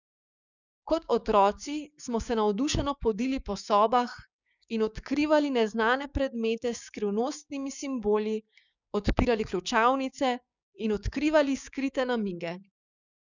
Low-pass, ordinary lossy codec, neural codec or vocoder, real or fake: 7.2 kHz; none; codec, 16 kHz, 6 kbps, DAC; fake